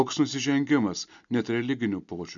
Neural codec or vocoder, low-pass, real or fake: none; 7.2 kHz; real